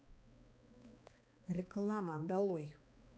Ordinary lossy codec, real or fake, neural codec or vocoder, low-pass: none; fake; codec, 16 kHz, 2 kbps, X-Codec, HuBERT features, trained on balanced general audio; none